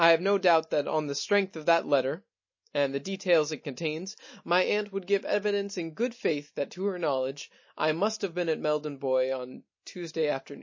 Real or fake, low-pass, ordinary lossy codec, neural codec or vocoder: real; 7.2 kHz; MP3, 32 kbps; none